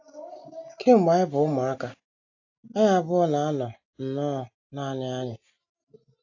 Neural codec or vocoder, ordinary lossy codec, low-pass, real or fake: none; AAC, 48 kbps; 7.2 kHz; real